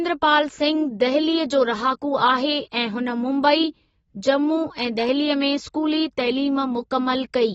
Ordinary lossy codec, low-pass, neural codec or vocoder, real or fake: AAC, 24 kbps; 14.4 kHz; none; real